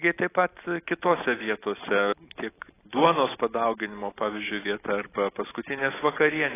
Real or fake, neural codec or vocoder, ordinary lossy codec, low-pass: real; none; AAC, 16 kbps; 3.6 kHz